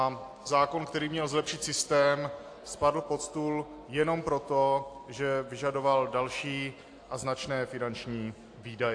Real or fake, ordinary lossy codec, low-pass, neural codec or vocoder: real; AAC, 48 kbps; 9.9 kHz; none